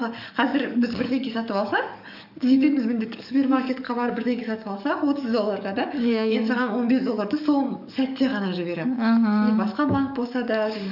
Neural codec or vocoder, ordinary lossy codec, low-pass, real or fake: codec, 44.1 kHz, 7.8 kbps, DAC; none; 5.4 kHz; fake